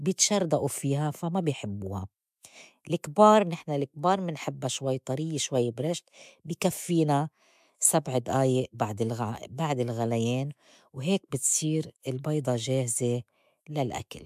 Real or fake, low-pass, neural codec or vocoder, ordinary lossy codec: fake; 14.4 kHz; autoencoder, 48 kHz, 128 numbers a frame, DAC-VAE, trained on Japanese speech; MP3, 96 kbps